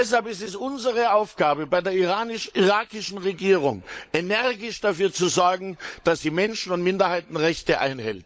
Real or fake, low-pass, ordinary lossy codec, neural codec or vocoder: fake; none; none; codec, 16 kHz, 16 kbps, FunCodec, trained on LibriTTS, 50 frames a second